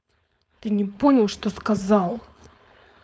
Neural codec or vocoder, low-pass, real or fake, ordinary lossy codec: codec, 16 kHz, 4.8 kbps, FACodec; none; fake; none